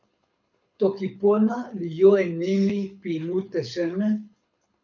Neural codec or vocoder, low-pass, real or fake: codec, 24 kHz, 6 kbps, HILCodec; 7.2 kHz; fake